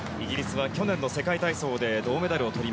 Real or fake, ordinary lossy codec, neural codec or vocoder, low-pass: real; none; none; none